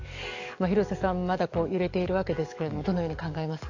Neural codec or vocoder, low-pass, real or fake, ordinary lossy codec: codec, 44.1 kHz, 7.8 kbps, DAC; 7.2 kHz; fake; AAC, 48 kbps